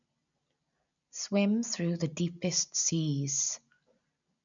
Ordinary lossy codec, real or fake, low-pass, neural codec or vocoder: none; real; 7.2 kHz; none